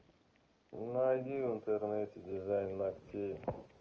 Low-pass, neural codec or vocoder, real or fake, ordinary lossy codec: 7.2 kHz; none; real; Opus, 24 kbps